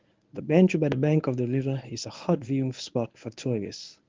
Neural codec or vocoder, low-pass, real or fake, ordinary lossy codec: codec, 24 kHz, 0.9 kbps, WavTokenizer, medium speech release version 1; 7.2 kHz; fake; Opus, 32 kbps